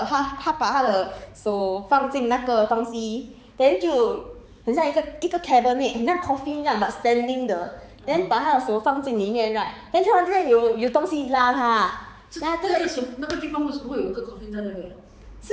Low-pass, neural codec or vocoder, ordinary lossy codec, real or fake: none; codec, 16 kHz, 4 kbps, X-Codec, HuBERT features, trained on balanced general audio; none; fake